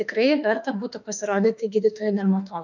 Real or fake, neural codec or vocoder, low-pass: fake; autoencoder, 48 kHz, 32 numbers a frame, DAC-VAE, trained on Japanese speech; 7.2 kHz